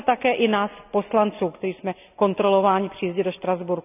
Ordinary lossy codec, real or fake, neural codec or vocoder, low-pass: none; real; none; 3.6 kHz